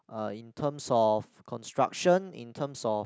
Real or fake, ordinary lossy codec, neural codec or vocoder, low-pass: real; none; none; none